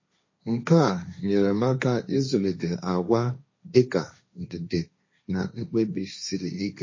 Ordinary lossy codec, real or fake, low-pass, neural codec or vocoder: MP3, 32 kbps; fake; 7.2 kHz; codec, 16 kHz, 1.1 kbps, Voila-Tokenizer